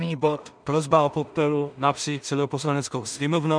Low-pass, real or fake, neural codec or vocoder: 9.9 kHz; fake; codec, 16 kHz in and 24 kHz out, 0.4 kbps, LongCat-Audio-Codec, two codebook decoder